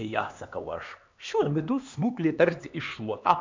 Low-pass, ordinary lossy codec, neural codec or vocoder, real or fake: 7.2 kHz; MP3, 64 kbps; codec, 16 kHz, 2 kbps, X-Codec, HuBERT features, trained on LibriSpeech; fake